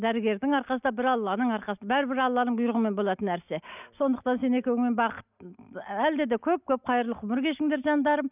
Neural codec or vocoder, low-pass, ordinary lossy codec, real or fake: none; 3.6 kHz; none; real